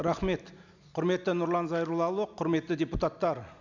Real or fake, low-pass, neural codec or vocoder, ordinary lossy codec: real; 7.2 kHz; none; none